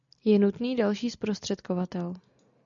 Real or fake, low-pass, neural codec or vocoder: real; 7.2 kHz; none